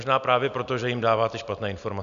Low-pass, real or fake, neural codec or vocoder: 7.2 kHz; real; none